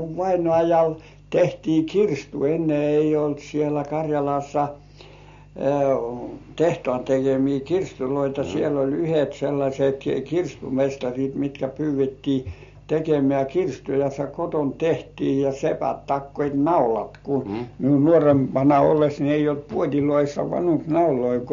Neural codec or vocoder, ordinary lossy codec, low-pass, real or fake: none; MP3, 48 kbps; 7.2 kHz; real